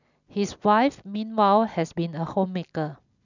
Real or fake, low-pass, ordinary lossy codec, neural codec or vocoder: real; 7.2 kHz; none; none